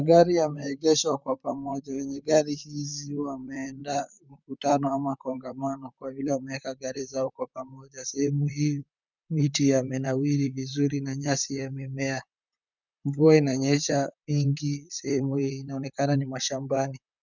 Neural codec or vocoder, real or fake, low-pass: vocoder, 44.1 kHz, 128 mel bands, Pupu-Vocoder; fake; 7.2 kHz